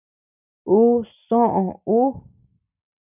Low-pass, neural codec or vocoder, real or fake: 3.6 kHz; none; real